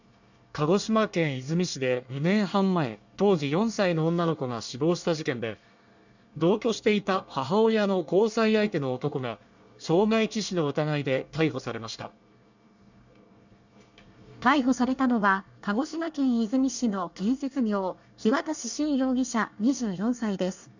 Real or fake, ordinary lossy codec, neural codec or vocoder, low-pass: fake; none; codec, 24 kHz, 1 kbps, SNAC; 7.2 kHz